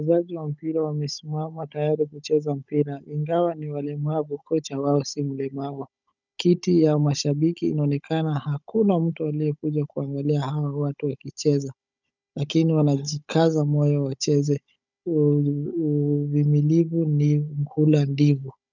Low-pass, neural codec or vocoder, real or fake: 7.2 kHz; codec, 16 kHz, 16 kbps, FunCodec, trained on Chinese and English, 50 frames a second; fake